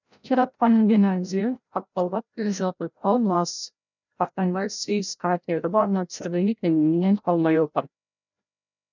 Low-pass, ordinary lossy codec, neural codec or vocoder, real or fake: 7.2 kHz; none; codec, 16 kHz, 0.5 kbps, FreqCodec, larger model; fake